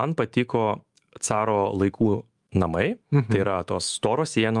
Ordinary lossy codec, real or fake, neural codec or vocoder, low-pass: Opus, 32 kbps; fake; autoencoder, 48 kHz, 128 numbers a frame, DAC-VAE, trained on Japanese speech; 10.8 kHz